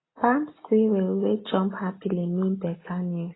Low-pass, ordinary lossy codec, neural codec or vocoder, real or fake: 7.2 kHz; AAC, 16 kbps; none; real